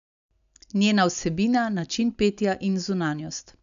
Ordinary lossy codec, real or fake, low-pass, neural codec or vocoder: none; real; 7.2 kHz; none